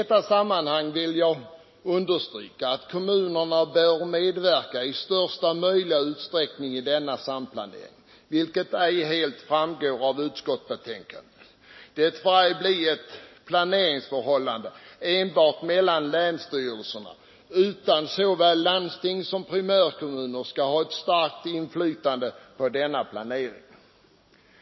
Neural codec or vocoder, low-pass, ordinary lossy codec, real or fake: none; 7.2 kHz; MP3, 24 kbps; real